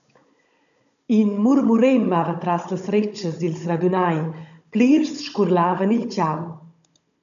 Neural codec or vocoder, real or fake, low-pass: codec, 16 kHz, 16 kbps, FunCodec, trained on Chinese and English, 50 frames a second; fake; 7.2 kHz